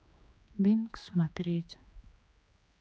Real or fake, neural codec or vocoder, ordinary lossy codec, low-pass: fake; codec, 16 kHz, 2 kbps, X-Codec, HuBERT features, trained on general audio; none; none